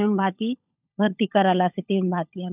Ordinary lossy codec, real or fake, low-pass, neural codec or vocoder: none; fake; 3.6 kHz; codec, 16 kHz, 16 kbps, FunCodec, trained on LibriTTS, 50 frames a second